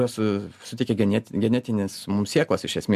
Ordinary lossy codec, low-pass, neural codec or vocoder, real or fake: MP3, 96 kbps; 14.4 kHz; none; real